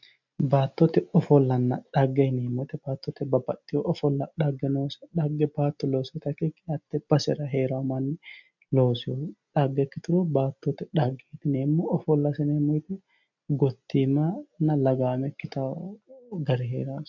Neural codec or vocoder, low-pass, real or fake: none; 7.2 kHz; real